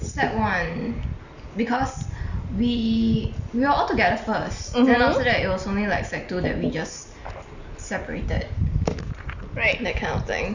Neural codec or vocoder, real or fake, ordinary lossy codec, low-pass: none; real; Opus, 64 kbps; 7.2 kHz